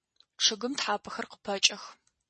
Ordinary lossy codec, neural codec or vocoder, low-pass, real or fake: MP3, 32 kbps; none; 10.8 kHz; real